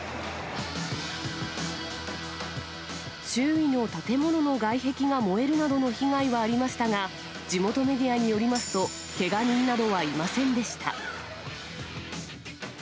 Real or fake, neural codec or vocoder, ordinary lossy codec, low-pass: real; none; none; none